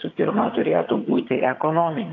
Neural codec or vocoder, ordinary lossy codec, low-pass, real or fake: vocoder, 22.05 kHz, 80 mel bands, HiFi-GAN; AAC, 32 kbps; 7.2 kHz; fake